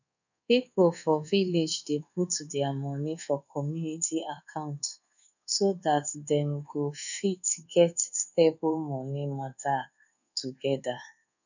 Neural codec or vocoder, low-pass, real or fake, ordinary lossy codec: codec, 24 kHz, 1.2 kbps, DualCodec; 7.2 kHz; fake; none